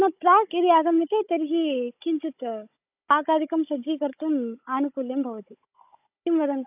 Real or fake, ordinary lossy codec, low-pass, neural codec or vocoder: fake; AAC, 32 kbps; 3.6 kHz; codec, 16 kHz, 16 kbps, FunCodec, trained on Chinese and English, 50 frames a second